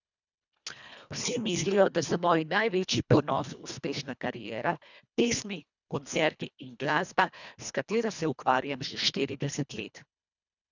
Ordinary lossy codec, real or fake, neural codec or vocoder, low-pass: none; fake; codec, 24 kHz, 1.5 kbps, HILCodec; 7.2 kHz